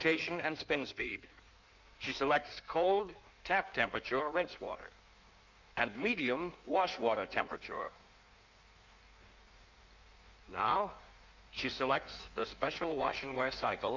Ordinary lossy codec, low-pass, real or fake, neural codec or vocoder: MP3, 64 kbps; 7.2 kHz; fake; codec, 16 kHz in and 24 kHz out, 1.1 kbps, FireRedTTS-2 codec